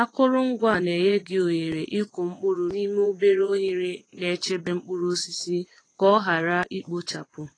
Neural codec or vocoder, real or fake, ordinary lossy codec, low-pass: vocoder, 22.05 kHz, 80 mel bands, WaveNeXt; fake; AAC, 32 kbps; 9.9 kHz